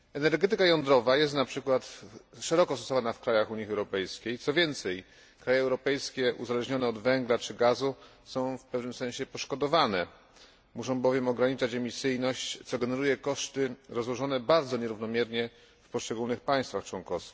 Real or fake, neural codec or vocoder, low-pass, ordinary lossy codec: real; none; none; none